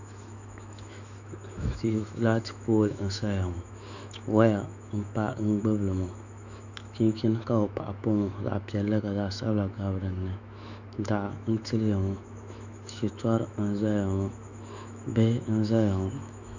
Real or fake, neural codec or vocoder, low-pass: fake; autoencoder, 48 kHz, 128 numbers a frame, DAC-VAE, trained on Japanese speech; 7.2 kHz